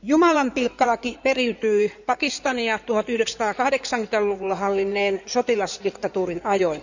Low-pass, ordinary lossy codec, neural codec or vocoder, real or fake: 7.2 kHz; none; codec, 16 kHz in and 24 kHz out, 2.2 kbps, FireRedTTS-2 codec; fake